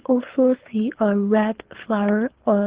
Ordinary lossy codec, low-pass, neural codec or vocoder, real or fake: Opus, 16 kbps; 3.6 kHz; codec, 24 kHz, 6 kbps, HILCodec; fake